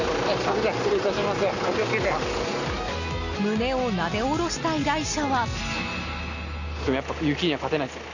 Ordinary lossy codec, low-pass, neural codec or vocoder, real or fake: none; 7.2 kHz; none; real